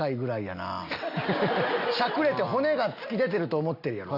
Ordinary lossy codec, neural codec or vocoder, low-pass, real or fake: none; none; 5.4 kHz; real